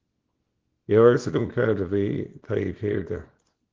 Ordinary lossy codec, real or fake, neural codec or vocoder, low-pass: Opus, 16 kbps; fake; codec, 24 kHz, 0.9 kbps, WavTokenizer, small release; 7.2 kHz